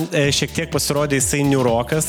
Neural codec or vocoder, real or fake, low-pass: none; real; 19.8 kHz